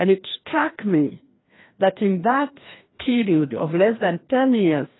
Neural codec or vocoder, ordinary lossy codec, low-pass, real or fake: codec, 16 kHz, 1 kbps, FreqCodec, larger model; AAC, 16 kbps; 7.2 kHz; fake